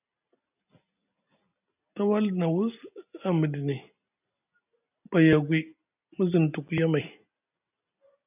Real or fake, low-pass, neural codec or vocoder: real; 3.6 kHz; none